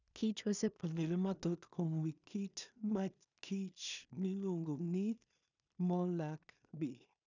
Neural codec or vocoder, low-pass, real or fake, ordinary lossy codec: codec, 16 kHz in and 24 kHz out, 0.4 kbps, LongCat-Audio-Codec, two codebook decoder; 7.2 kHz; fake; none